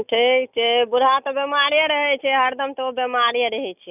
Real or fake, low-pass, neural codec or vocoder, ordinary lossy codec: real; 3.6 kHz; none; none